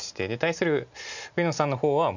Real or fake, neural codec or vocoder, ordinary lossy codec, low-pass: real; none; none; 7.2 kHz